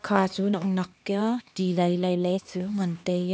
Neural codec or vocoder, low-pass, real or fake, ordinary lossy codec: codec, 16 kHz, 2 kbps, X-Codec, HuBERT features, trained on LibriSpeech; none; fake; none